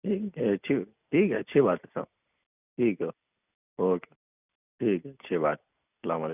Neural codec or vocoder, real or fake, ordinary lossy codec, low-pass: none; real; none; 3.6 kHz